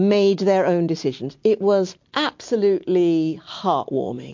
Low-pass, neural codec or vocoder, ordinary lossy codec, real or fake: 7.2 kHz; none; MP3, 48 kbps; real